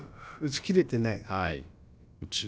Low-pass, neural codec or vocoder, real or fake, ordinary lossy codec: none; codec, 16 kHz, about 1 kbps, DyCAST, with the encoder's durations; fake; none